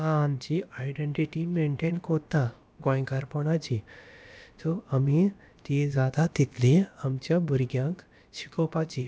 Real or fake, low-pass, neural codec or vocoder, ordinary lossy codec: fake; none; codec, 16 kHz, about 1 kbps, DyCAST, with the encoder's durations; none